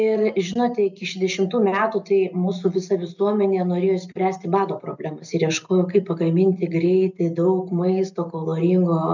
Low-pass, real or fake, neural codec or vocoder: 7.2 kHz; real; none